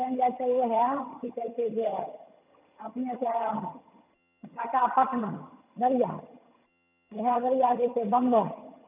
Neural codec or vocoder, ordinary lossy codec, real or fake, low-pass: vocoder, 22.05 kHz, 80 mel bands, HiFi-GAN; none; fake; 3.6 kHz